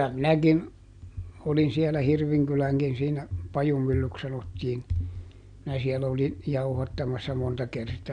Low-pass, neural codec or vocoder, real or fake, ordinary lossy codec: 9.9 kHz; none; real; none